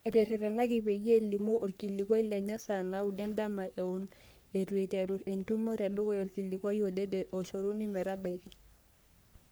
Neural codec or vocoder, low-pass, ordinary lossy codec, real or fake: codec, 44.1 kHz, 3.4 kbps, Pupu-Codec; none; none; fake